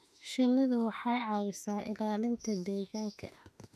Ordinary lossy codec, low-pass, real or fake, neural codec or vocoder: none; 14.4 kHz; fake; autoencoder, 48 kHz, 32 numbers a frame, DAC-VAE, trained on Japanese speech